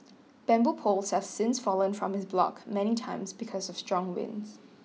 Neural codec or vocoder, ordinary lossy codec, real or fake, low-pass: none; none; real; none